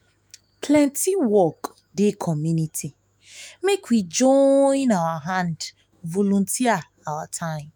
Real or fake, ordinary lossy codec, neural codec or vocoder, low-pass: fake; none; autoencoder, 48 kHz, 128 numbers a frame, DAC-VAE, trained on Japanese speech; none